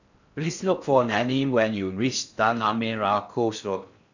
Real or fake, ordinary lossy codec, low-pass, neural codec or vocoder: fake; none; 7.2 kHz; codec, 16 kHz in and 24 kHz out, 0.6 kbps, FocalCodec, streaming, 4096 codes